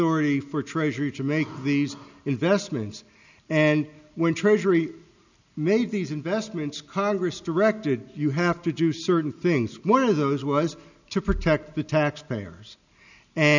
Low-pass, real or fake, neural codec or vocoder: 7.2 kHz; real; none